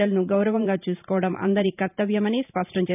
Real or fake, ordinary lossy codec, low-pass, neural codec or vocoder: fake; none; 3.6 kHz; vocoder, 44.1 kHz, 128 mel bands every 512 samples, BigVGAN v2